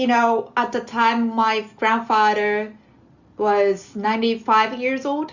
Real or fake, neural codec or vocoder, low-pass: real; none; 7.2 kHz